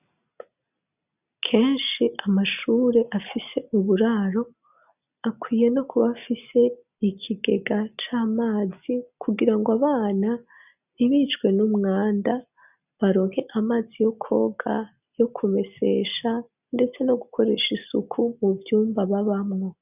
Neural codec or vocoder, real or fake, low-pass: none; real; 3.6 kHz